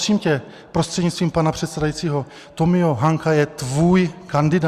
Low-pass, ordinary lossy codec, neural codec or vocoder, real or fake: 14.4 kHz; Opus, 64 kbps; none; real